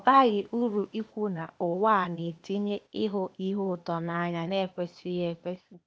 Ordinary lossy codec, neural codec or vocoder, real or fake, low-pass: none; codec, 16 kHz, 0.8 kbps, ZipCodec; fake; none